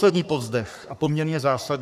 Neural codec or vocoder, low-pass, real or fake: codec, 44.1 kHz, 3.4 kbps, Pupu-Codec; 14.4 kHz; fake